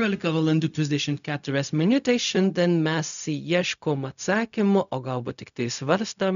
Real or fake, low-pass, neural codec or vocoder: fake; 7.2 kHz; codec, 16 kHz, 0.4 kbps, LongCat-Audio-Codec